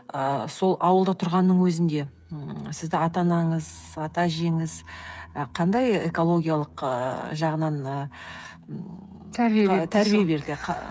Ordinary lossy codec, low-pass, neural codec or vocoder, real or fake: none; none; codec, 16 kHz, 8 kbps, FreqCodec, smaller model; fake